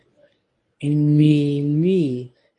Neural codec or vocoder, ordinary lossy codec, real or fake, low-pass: codec, 24 kHz, 0.9 kbps, WavTokenizer, medium speech release version 1; MP3, 48 kbps; fake; 10.8 kHz